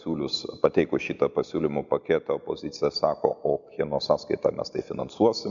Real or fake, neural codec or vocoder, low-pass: real; none; 7.2 kHz